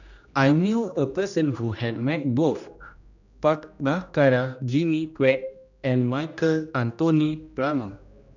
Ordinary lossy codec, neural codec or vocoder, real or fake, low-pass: none; codec, 16 kHz, 1 kbps, X-Codec, HuBERT features, trained on general audio; fake; 7.2 kHz